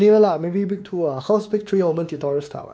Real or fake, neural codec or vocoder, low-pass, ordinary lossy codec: fake; codec, 16 kHz, 2 kbps, X-Codec, WavLM features, trained on Multilingual LibriSpeech; none; none